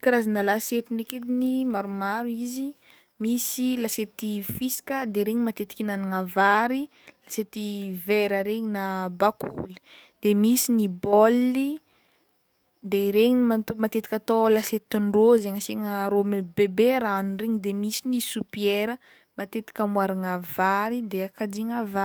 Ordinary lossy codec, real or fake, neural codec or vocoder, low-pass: none; fake; codec, 44.1 kHz, 7.8 kbps, DAC; none